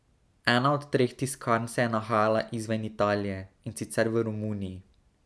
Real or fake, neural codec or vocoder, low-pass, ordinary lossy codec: real; none; none; none